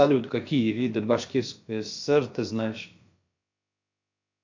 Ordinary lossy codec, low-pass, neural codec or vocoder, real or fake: MP3, 48 kbps; 7.2 kHz; codec, 16 kHz, about 1 kbps, DyCAST, with the encoder's durations; fake